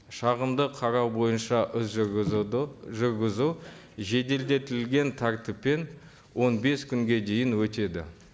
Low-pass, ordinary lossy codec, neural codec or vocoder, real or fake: none; none; none; real